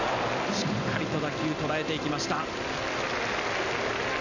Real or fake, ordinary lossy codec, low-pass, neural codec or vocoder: real; none; 7.2 kHz; none